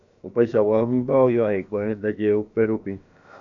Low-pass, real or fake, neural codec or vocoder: 7.2 kHz; fake; codec, 16 kHz, about 1 kbps, DyCAST, with the encoder's durations